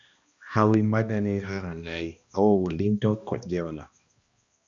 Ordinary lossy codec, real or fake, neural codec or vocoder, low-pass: Opus, 64 kbps; fake; codec, 16 kHz, 1 kbps, X-Codec, HuBERT features, trained on balanced general audio; 7.2 kHz